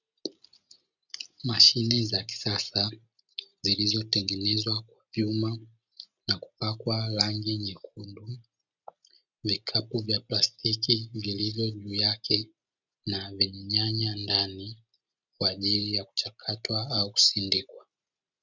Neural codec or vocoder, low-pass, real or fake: none; 7.2 kHz; real